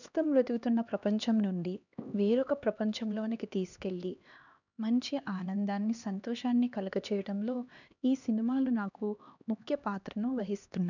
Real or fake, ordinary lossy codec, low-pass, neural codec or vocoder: fake; none; 7.2 kHz; codec, 16 kHz, 2 kbps, X-Codec, HuBERT features, trained on LibriSpeech